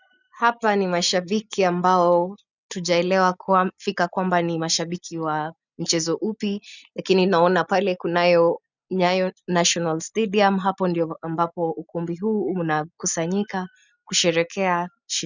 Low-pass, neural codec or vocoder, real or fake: 7.2 kHz; none; real